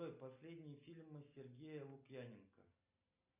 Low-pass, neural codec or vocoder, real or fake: 3.6 kHz; none; real